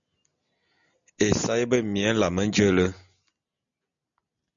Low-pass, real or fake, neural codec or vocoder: 7.2 kHz; real; none